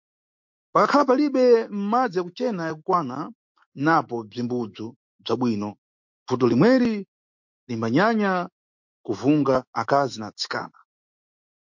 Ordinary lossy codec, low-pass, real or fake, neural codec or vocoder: MP3, 48 kbps; 7.2 kHz; fake; autoencoder, 48 kHz, 128 numbers a frame, DAC-VAE, trained on Japanese speech